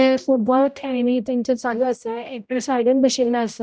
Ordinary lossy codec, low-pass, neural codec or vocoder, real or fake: none; none; codec, 16 kHz, 0.5 kbps, X-Codec, HuBERT features, trained on general audio; fake